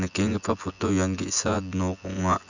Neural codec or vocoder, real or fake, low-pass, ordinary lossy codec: vocoder, 24 kHz, 100 mel bands, Vocos; fake; 7.2 kHz; none